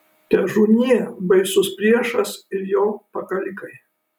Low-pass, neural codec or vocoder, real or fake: 19.8 kHz; none; real